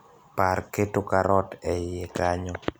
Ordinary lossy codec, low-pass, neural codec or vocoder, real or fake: none; none; none; real